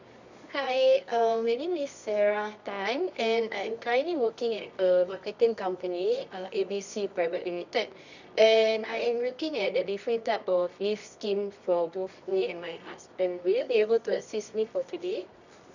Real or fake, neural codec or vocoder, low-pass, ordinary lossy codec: fake; codec, 24 kHz, 0.9 kbps, WavTokenizer, medium music audio release; 7.2 kHz; none